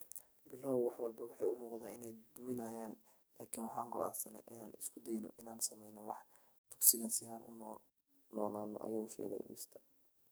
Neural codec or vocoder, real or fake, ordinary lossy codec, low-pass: codec, 44.1 kHz, 2.6 kbps, SNAC; fake; none; none